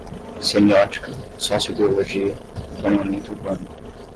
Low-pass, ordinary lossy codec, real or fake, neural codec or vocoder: 10.8 kHz; Opus, 16 kbps; real; none